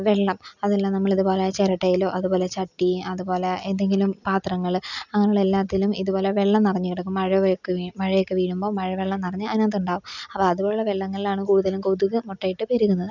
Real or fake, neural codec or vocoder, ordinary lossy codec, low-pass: real; none; none; 7.2 kHz